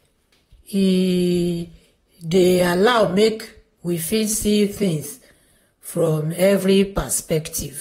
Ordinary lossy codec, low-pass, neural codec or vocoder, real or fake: AAC, 48 kbps; 19.8 kHz; vocoder, 44.1 kHz, 128 mel bands, Pupu-Vocoder; fake